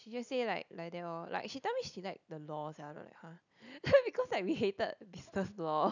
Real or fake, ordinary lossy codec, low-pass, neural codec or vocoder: real; none; 7.2 kHz; none